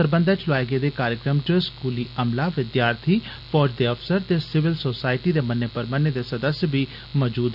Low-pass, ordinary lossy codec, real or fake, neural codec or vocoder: 5.4 kHz; none; real; none